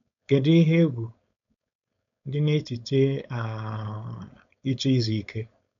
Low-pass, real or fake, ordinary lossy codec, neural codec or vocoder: 7.2 kHz; fake; none; codec, 16 kHz, 4.8 kbps, FACodec